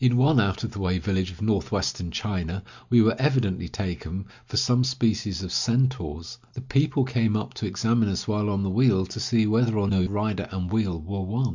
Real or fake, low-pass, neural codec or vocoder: real; 7.2 kHz; none